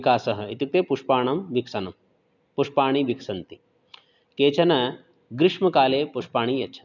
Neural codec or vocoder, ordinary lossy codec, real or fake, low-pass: none; none; real; 7.2 kHz